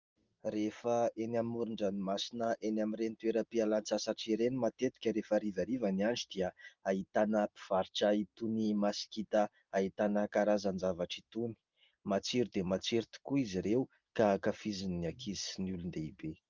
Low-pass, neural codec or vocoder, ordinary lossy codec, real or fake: 7.2 kHz; none; Opus, 32 kbps; real